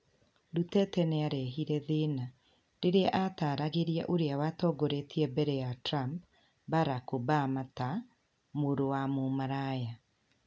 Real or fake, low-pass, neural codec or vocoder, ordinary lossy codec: real; none; none; none